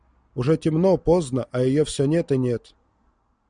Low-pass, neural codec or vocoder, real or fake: 9.9 kHz; none; real